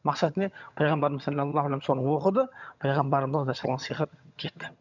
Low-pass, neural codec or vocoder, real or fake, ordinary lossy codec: 7.2 kHz; vocoder, 22.05 kHz, 80 mel bands, HiFi-GAN; fake; none